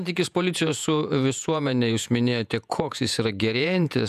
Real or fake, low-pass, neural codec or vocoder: fake; 14.4 kHz; vocoder, 48 kHz, 128 mel bands, Vocos